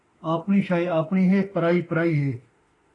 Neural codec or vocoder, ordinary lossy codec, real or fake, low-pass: autoencoder, 48 kHz, 32 numbers a frame, DAC-VAE, trained on Japanese speech; AAC, 32 kbps; fake; 10.8 kHz